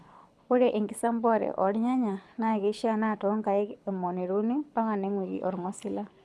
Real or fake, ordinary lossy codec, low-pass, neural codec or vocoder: fake; none; none; codec, 24 kHz, 6 kbps, HILCodec